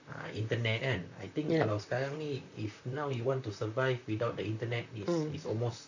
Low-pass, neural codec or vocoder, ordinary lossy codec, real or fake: 7.2 kHz; vocoder, 44.1 kHz, 128 mel bands, Pupu-Vocoder; none; fake